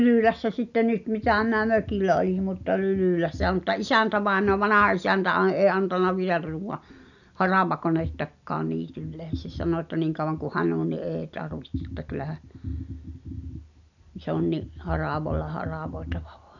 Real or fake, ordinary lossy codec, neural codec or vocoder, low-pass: real; none; none; 7.2 kHz